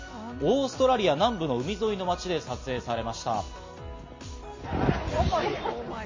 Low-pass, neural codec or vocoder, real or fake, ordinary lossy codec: 7.2 kHz; none; real; MP3, 32 kbps